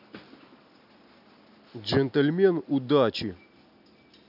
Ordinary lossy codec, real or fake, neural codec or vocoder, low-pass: none; real; none; 5.4 kHz